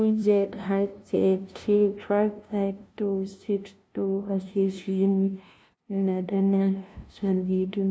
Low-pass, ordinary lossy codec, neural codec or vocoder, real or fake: none; none; codec, 16 kHz, 0.5 kbps, FunCodec, trained on LibriTTS, 25 frames a second; fake